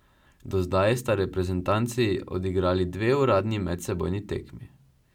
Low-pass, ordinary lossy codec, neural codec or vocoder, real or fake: 19.8 kHz; none; none; real